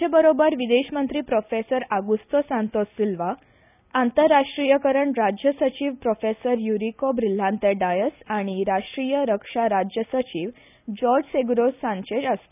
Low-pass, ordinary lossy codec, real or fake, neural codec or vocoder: 3.6 kHz; none; real; none